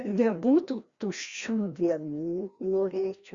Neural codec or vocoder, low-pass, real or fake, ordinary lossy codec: codec, 16 kHz, 1 kbps, FreqCodec, larger model; 7.2 kHz; fake; Opus, 64 kbps